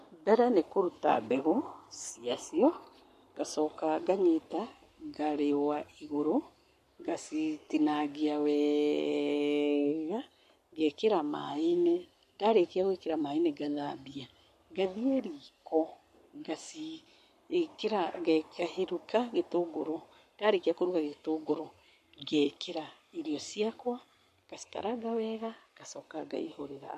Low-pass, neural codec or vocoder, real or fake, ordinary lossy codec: 14.4 kHz; codec, 44.1 kHz, 7.8 kbps, Pupu-Codec; fake; MP3, 64 kbps